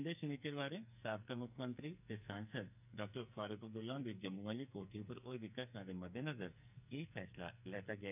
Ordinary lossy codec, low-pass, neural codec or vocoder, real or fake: none; 3.6 kHz; codec, 44.1 kHz, 2.6 kbps, SNAC; fake